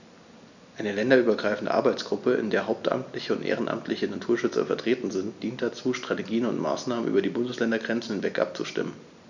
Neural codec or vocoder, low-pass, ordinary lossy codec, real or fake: none; 7.2 kHz; none; real